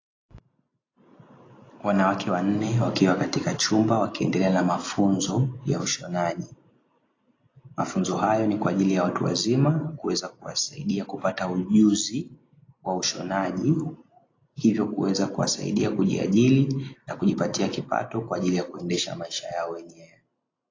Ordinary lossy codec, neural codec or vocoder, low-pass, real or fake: AAC, 32 kbps; none; 7.2 kHz; real